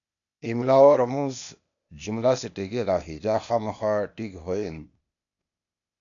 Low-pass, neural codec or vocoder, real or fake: 7.2 kHz; codec, 16 kHz, 0.8 kbps, ZipCodec; fake